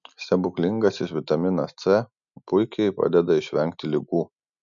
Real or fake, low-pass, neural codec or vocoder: real; 7.2 kHz; none